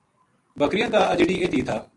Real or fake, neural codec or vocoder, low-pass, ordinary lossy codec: real; none; 10.8 kHz; MP3, 48 kbps